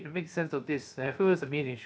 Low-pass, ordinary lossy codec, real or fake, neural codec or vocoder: none; none; fake; codec, 16 kHz, 0.7 kbps, FocalCodec